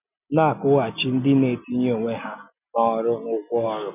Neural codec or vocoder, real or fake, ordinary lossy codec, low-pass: none; real; none; 3.6 kHz